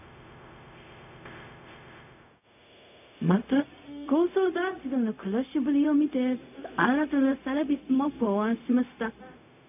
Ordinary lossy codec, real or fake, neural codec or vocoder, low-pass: none; fake; codec, 16 kHz, 0.4 kbps, LongCat-Audio-Codec; 3.6 kHz